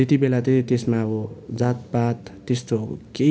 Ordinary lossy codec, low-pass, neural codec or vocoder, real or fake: none; none; none; real